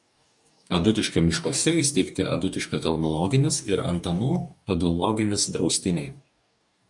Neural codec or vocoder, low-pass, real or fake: codec, 44.1 kHz, 2.6 kbps, DAC; 10.8 kHz; fake